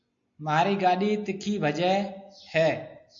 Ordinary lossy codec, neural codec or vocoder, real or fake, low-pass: MP3, 48 kbps; none; real; 7.2 kHz